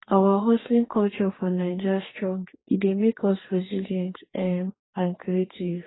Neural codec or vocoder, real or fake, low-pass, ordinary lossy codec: codec, 44.1 kHz, 2.6 kbps, DAC; fake; 7.2 kHz; AAC, 16 kbps